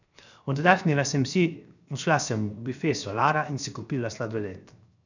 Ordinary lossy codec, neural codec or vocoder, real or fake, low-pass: none; codec, 16 kHz, 0.7 kbps, FocalCodec; fake; 7.2 kHz